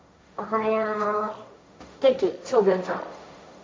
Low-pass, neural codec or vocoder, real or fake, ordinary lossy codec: none; codec, 16 kHz, 1.1 kbps, Voila-Tokenizer; fake; none